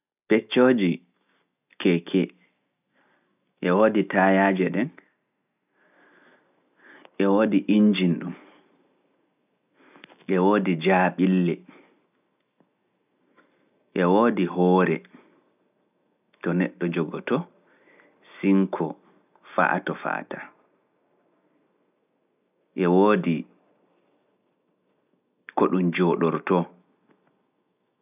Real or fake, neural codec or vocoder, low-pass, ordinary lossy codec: real; none; 3.6 kHz; none